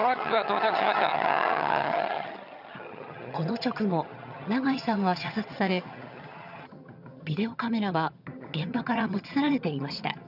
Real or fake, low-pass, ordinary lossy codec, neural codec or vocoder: fake; 5.4 kHz; none; vocoder, 22.05 kHz, 80 mel bands, HiFi-GAN